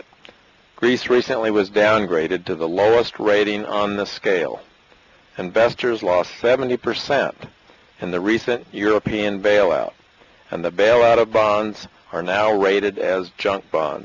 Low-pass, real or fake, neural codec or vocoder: 7.2 kHz; real; none